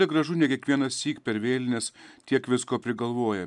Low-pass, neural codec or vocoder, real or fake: 10.8 kHz; none; real